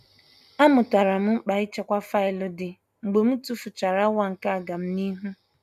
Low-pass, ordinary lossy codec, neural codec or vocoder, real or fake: 14.4 kHz; AAC, 96 kbps; none; real